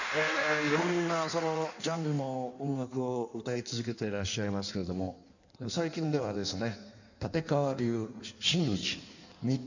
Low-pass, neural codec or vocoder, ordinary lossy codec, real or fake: 7.2 kHz; codec, 16 kHz in and 24 kHz out, 1.1 kbps, FireRedTTS-2 codec; none; fake